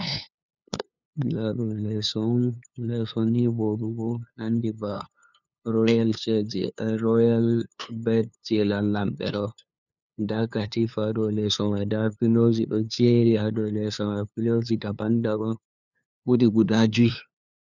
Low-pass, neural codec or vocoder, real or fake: 7.2 kHz; codec, 16 kHz, 2 kbps, FunCodec, trained on LibriTTS, 25 frames a second; fake